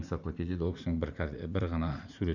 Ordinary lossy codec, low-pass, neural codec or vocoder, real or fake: none; 7.2 kHz; vocoder, 44.1 kHz, 80 mel bands, Vocos; fake